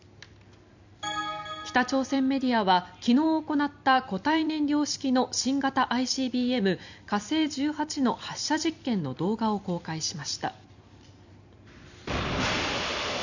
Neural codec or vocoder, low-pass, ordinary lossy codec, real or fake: vocoder, 44.1 kHz, 128 mel bands every 256 samples, BigVGAN v2; 7.2 kHz; none; fake